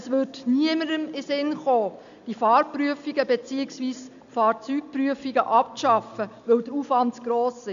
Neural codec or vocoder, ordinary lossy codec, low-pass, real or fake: none; MP3, 96 kbps; 7.2 kHz; real